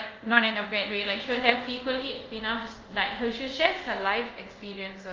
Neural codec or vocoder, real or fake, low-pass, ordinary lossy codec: codec, 24 kHz, 0.5 kbps, DualCodec; fake; 7.2 kHz; Opus, 24 kbps